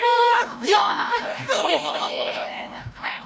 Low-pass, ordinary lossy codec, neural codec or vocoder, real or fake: none; none; codec, 16 kHz, 0.5 kbps, FreqCodec, larger model; fake